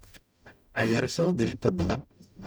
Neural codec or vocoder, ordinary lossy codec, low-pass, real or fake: codec, 44.1 kHz, 0.9 kbps, DAC; none; none; fake